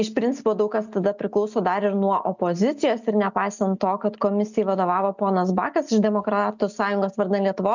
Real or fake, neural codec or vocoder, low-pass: real; none; 7.2 kHz